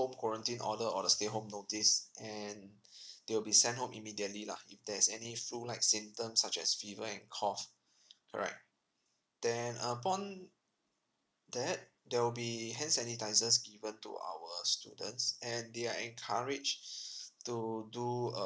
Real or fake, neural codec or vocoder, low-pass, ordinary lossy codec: real; none; none; none